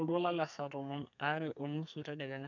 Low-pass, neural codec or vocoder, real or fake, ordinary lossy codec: 7.2 kHz; codec, 32 kHz, 1.9 kbps, SNAC; fake; none